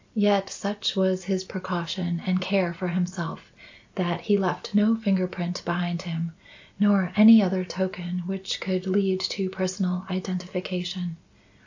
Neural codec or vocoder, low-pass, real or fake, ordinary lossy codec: none; 7.2 kHz; real; AAC, 48 kbps